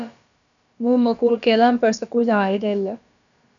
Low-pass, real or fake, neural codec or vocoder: 7.2 kHz; fake; codec, 16 kHz, about 1 kbps, DyCAST, with the encoder's durations